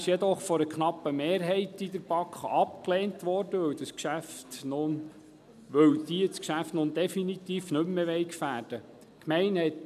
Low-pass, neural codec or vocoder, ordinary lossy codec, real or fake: 14.4 kHz; none; none; real